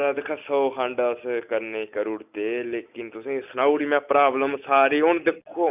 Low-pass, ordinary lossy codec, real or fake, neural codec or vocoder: 3.6 kHz; none; real; none